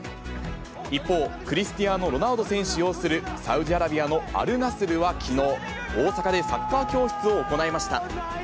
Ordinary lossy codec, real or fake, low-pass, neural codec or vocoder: none; real; none; none